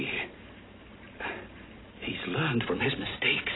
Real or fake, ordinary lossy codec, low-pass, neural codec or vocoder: real; AAC, 16 kbps; 7.2 kHz; none